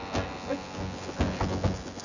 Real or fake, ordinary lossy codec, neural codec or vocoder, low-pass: fake; none; vocoder, 24 kHz, 100 mel bands, Vocos; 7.2 kHz